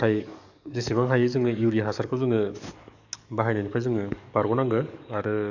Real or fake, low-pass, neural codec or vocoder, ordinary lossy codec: fake; 7.2 kHz; codec, 44.1 kHz, 7.8 kbps, DAC; none